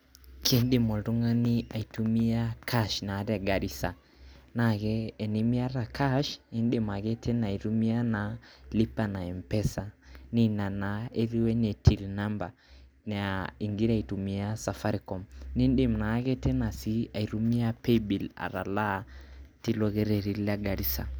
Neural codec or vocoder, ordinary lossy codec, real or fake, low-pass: none; none; real; none